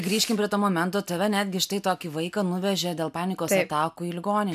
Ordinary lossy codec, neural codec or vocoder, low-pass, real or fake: MP3, 96 kbps; none; 14.4 kHz; real